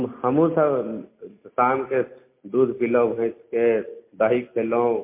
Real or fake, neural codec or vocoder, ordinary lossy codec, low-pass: real; none; MP3, 24 kbps; 3.6 kHz